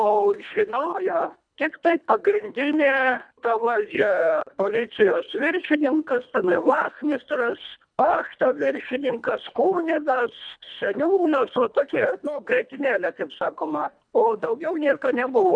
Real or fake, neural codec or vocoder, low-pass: fake; codec, 24 kHz, 1.5 kbps, HILCodec; 9.9 kHz